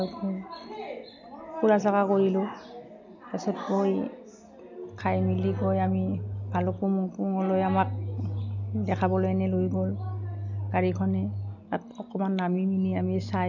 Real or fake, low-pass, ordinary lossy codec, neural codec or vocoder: real; 7.2 kHz; none; none